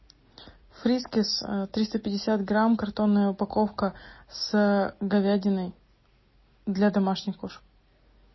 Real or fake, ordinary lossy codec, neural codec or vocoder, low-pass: real; MP3, 24 kbps; none; 7.2 kHz